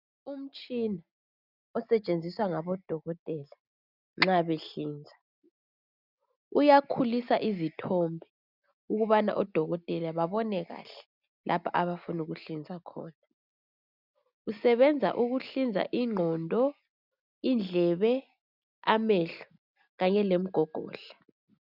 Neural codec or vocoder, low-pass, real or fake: none; 5.4 kHz; real